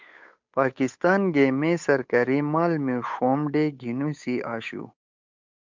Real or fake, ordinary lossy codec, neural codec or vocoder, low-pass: fake; MP3, 64 kbps; codec, 16 kHz, 8 kbps, FunCodec, trained on Chinese and English, 25 frames a second; 7.2 kHz